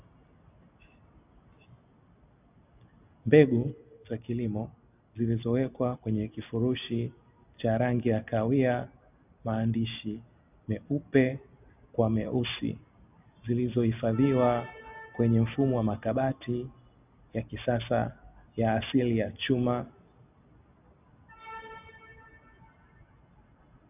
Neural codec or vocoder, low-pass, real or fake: none; 3.6 kHz; real